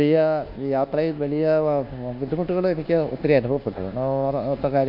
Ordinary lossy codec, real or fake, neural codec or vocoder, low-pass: none; fake; codec, 24 kHz, 1.2 kbps, DualCodec; 5.4 kHz